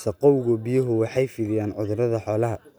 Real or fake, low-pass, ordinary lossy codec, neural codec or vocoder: real; none; none; none